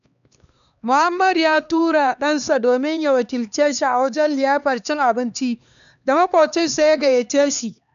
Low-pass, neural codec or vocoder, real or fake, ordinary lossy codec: 7.2 kHz; codec, 16 kHz, 2 kbps, X-Codec, HuBERT features, trained on LibriSpeech; fake; none